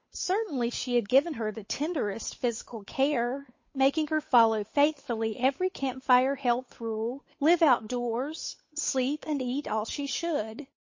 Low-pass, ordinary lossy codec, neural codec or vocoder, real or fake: 7.2 kHz; MP3, 32 kbps; codec, 16 kHz, 8 kbps, FunCodec, trained on Chinese and English, 25 frames a second; fake